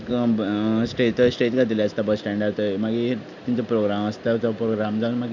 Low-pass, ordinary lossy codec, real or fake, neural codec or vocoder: 7.2 kHz; none; real; none